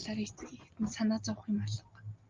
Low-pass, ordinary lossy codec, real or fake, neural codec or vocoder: 7.2 kHz; Opus, 32 kbps; real; none